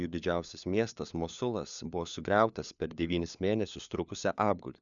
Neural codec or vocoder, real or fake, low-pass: codec, 16 kHz, 4 kbps, FunCodec, trained on LibriTTS, 50 frames a second; fake; 7.2 kHz